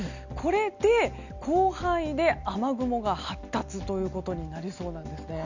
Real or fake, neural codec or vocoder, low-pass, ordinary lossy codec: real; none; 7.2 kHz; none